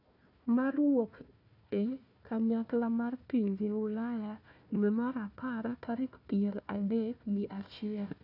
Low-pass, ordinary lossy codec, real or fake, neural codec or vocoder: 5.4 kHz; none; fake; codec, 16 kHz, 1 kbps, FunCodec, trained on Chinese and English, 50 frames a second